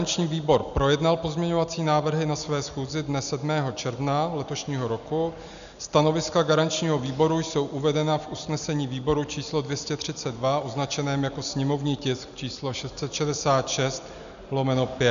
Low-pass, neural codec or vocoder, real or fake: 7.2 kHz; none; real